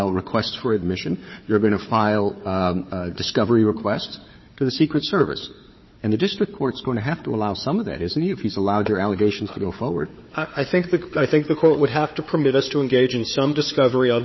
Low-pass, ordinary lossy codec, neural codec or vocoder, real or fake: 7.2 kHz; MP3, 24 kbps; codec, 16 kHz, 4 kbps, FunCodec, trained on Chinese and English, 50 frames a second; fake